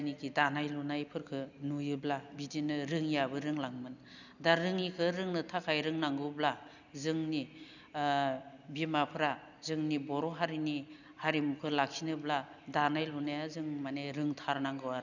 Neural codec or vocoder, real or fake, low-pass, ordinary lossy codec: none; real; 7.2 kHz; none